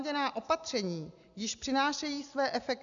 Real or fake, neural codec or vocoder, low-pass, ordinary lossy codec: real; none; 7.2 kHz; AAC, 64 kbps